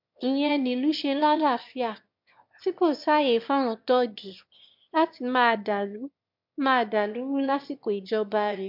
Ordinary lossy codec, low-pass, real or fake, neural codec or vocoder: MP3, 48 kbps; 5.4 kHz; fake; autoencoder, 22.05 kHz, a latent of 192 numbers a frame, VITS, trained on one speaker